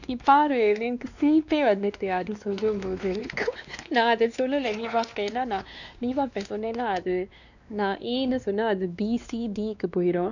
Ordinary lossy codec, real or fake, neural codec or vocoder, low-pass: none; fake; codec, 16 kHz, 2 kbps, X-Codec, WavLM features, trained on Multilingual LibriSpeech; 7.2 kHz